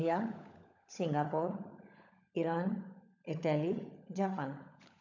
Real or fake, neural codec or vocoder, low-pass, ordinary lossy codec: fake; codec, 16 kHz, 16 kbps, FunCodec, trained on LibriTTS, 50 frames a second; 7.2 kHz; none